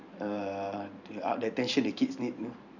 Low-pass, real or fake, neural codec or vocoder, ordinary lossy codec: 7.2 kHz; fake; vocoder, 44.1 kHz, 128 mel bands every 512 samples, BigVGAN v2; none